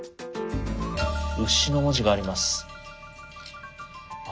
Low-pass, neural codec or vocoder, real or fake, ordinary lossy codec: none; none; real; none